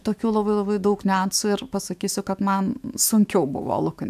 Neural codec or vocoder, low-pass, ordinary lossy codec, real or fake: autoencoder, 48 kHz, 128 numbers a frame, DAC-VAE, trained on Japanese speech; 14.4 kHz; AAC, 96 kbps; fake